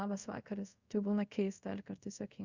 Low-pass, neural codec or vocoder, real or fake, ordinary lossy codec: 7.2 kHz; codec, 24 kHz, 0.5 kbps, DualCodec; fake; Opus, 64 kbps